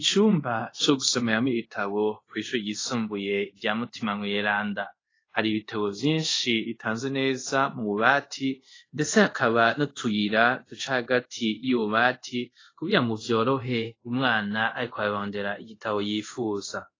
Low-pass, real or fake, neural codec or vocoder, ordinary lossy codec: 7.2 kHz; fake; codec, 24 kHz, 0.5 kbps, DualCodec; AAC, 32 kbps